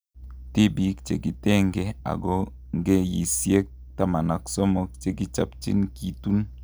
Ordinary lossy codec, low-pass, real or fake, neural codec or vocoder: none; none; real; none